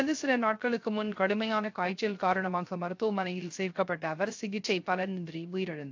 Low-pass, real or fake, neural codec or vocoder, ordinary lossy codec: 7.2 kHz; fake; codec, 16 kHz, 0.3 kbps, FocalCodec; AAC, 48 kbps